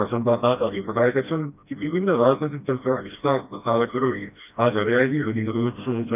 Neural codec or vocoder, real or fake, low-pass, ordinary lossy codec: codec, 16 kHz, 1 kbps, FreqCodec, smaller model; fake; 3.6 kHz; none